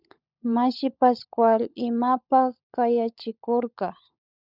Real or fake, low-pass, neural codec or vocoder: fake; 5.4 kHz; codec, 16 kHz, 16 kbps, FunCodec, trained on LibriTTS, 50 frames a second